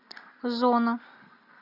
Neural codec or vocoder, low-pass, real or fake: none; 5.4 kHz; real